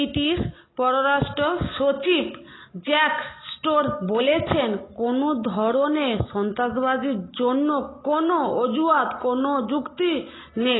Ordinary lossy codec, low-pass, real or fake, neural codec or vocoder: AAC, 16 kbps; 7.2 kHz; real; none